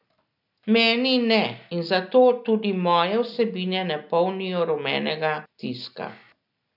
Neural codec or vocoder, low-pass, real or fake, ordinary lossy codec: none; 5.4 kHz; real; none